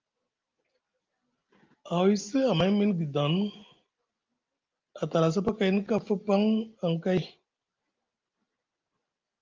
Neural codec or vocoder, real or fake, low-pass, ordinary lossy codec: none; real; 7.2 kHz; Opus, 32 kbps